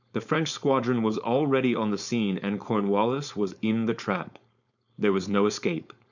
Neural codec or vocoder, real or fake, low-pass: codec, 16 kHz, 4.8 kbps, FACodec; fake; 7.2 kHz